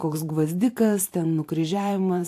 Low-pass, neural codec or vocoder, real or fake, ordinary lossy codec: 14.4 kHz; vocoder, 44.1 kHz, 128 mel bands every 512 samples, BigVGAN v2; fake; AAC, 64 kbps